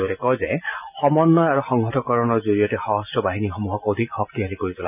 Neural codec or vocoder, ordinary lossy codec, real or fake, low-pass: none; none; real; 3.6 kHz